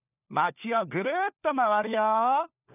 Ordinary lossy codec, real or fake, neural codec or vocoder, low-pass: none; fake; codec, 16 kHz, 4 kbps, FunCodec, trained on LibriTTS, 50 frames a second; 3.6 kHz